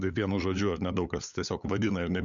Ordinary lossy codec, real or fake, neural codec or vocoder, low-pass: MP3, 96 kbps; fake; codec, 16 kHz, 8 kbps, FunCodec, trained on LibriTTS, 25 frames a second; 7.2 kHz